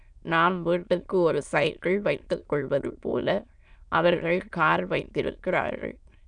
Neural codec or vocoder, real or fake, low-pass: autoencoder, 22.05 kHz, a latent of 192 numbers a frame, VITS, trained on many speakers; fake; 9.9 kHz